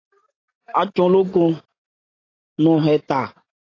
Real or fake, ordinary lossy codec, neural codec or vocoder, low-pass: real; AAC, 32 kbps; none; 7.2 kHz